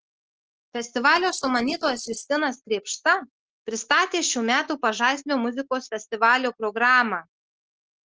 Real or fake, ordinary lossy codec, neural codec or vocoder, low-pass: real; Opus, 16 kbps; none; 7.2 kHz